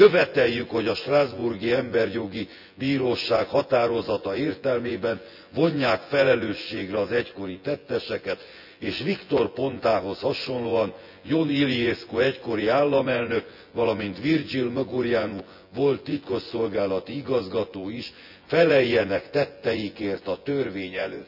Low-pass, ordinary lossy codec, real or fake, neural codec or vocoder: 5.4 kHz; none; fake; vocoder, 24 kHz, 100 mel bands, Vocos